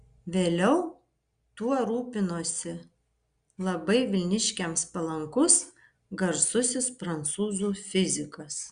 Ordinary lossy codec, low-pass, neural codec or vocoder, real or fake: Opus, 64 kbps; 9.9 kHz; none; real